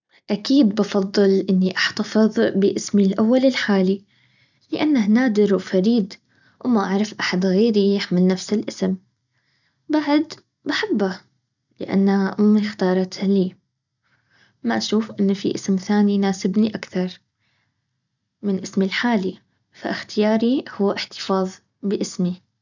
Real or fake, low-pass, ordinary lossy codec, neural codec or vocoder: real; 7.2 kHz; none; none